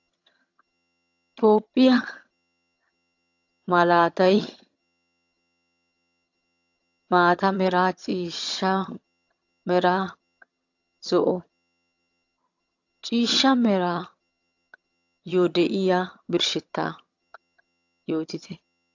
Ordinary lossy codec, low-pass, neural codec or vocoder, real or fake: AAC, 48 kbps; 7.2 kHz; vocoder, 22.05 kHz, 80 mel bands, HiFi-GAN; fake